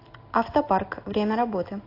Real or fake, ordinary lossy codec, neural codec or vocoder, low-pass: real; AAC, 32 kbps; none; 5.4 kHz